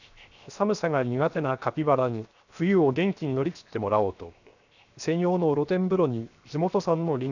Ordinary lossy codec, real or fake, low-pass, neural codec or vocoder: none; fake; 7.2 kHz; codec, 16 kHz, 0.7 kbps, FocalCodec